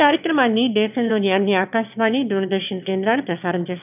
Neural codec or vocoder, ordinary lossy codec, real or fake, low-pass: autoencoder, 22.05 kHz, a latent of 192 numbers a frame, VITS, trained on one speaker; none; fake; 3.6 kHz